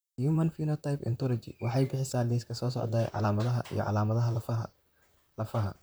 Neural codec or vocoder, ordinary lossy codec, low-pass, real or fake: vocoder, 44.1 kHz, 128 mel bands, Pupu-Vocoder; none; none; fake